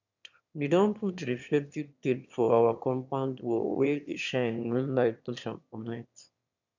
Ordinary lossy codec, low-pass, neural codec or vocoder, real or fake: none; 7.2 kHz; autoencoder, 22.05 kHz, a latent of 192 numbers a frame, VITS, trained on one speaker; fake